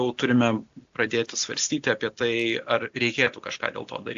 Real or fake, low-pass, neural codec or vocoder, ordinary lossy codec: real; 7.2 kHz; none; AAC, 64 kbps